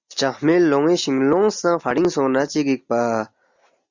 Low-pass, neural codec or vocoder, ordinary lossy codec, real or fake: 7.2 kHz; none; Opus, 64 kbps; real